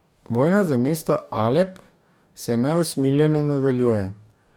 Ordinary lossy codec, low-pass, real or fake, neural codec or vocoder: none; 19.8 kHz; fake; codec, 44.1 kHz, 2.6 kbps, DAC